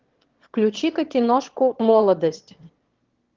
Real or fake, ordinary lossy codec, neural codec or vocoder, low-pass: fake; Opus, 16 kbps; autoencoder, 22.05 kHz, a latent of 192 numbers a frame, VITS, trained on one speaker; 7.2 kHz